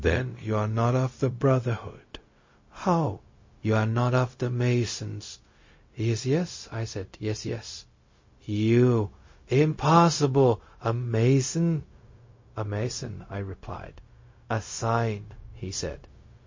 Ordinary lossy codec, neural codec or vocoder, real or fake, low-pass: MP3, 32 kbps; codec, 16 kHz, 0.4 kbps, LongCat-Audio-Codec; fake; 7.2 kHz